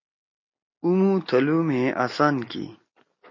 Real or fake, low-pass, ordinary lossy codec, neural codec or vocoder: real; 7.2 kHz; MP3, 32 kbps; none